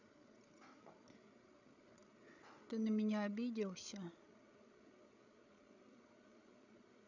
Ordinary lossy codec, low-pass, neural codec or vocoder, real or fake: none; 7.2 kHz; codec, 16 kHz, 8 kbps, FreqCodec, larger model; fake